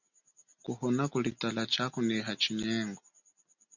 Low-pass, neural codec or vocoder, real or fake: 7.2 kHz; none; real